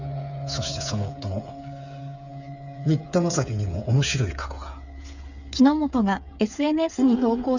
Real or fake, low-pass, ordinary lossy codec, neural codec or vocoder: fake; 7.2 kHz; none; codec, 16 kHz, 8 kbps, FreqCodec, smaller model